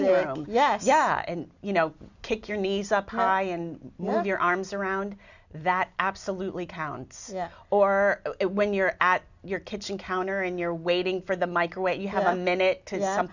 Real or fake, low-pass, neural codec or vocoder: real; 7.2 kHz; none